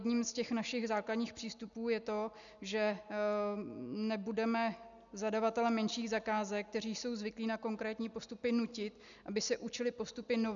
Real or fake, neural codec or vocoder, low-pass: real; none; 7.2 kHz